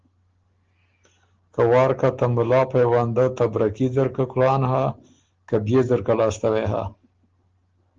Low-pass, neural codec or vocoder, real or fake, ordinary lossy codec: 7.2 kHz; none; real; Opus, 16 kbps